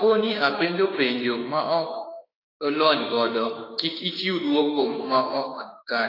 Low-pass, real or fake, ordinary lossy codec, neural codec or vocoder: 5.4 kHz; fake; AAC, 24 kbps; autoencoder, 48 kHz, 32 numbers a frame, DAC-VAE, trained on Japanese speech